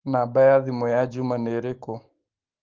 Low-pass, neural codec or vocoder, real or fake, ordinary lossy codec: 7.2 kHz; autoencoder, 48 kHz, 128 numbers a frame, DAC-VAE, trained on Japanese speech; fake; Opus, 16 kbps